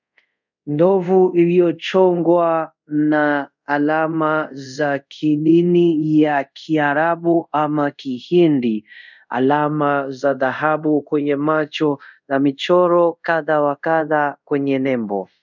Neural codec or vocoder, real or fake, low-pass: codec, 24 kHz, 0.5 kbps, DualCodec; fake; 7.2 kHz